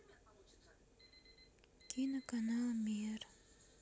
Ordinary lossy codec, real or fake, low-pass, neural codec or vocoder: none; real; none; none